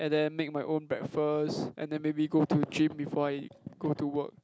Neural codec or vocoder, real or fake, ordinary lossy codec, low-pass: none; real; none; none